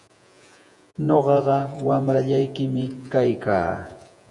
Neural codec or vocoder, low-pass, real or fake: vocoder, 48 kHz, 128 mel bands, Vocos; 10.8 kHz; fake